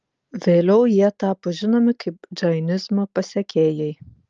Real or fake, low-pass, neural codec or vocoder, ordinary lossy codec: real; 7.2 kHz; none; Opus, 24 kbps